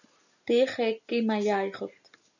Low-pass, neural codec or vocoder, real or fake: 7.2 kHz; none; real